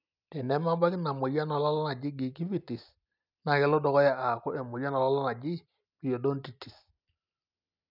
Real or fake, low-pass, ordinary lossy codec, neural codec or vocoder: real; 5.4 kHz; none; none